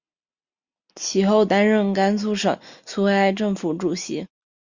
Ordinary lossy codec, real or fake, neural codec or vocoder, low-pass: Opus, 64 kbps; real; none; 7.2 kHz